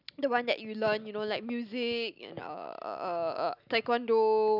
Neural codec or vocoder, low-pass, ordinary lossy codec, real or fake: none; 5.4 kHz; none; real